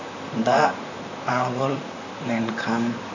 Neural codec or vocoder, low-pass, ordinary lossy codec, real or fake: vocoder, 44.1 kHz, 128 mel bands, Pupu-Vocoder; 7.2 kHz; none; fake